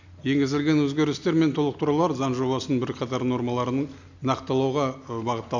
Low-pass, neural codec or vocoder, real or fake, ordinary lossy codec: 7.2 kHz; none; real; none